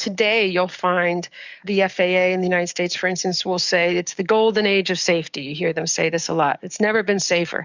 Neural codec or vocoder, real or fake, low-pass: codec, 44.1 kHz, 7.8 kbps, DAC; fake; 7.2 kHz